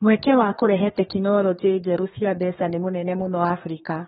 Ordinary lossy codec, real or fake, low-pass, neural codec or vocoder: AAC, 16 kbps; fake; 14.4 kHz; codec, 32 kHz, 1.9 kbps, SNAC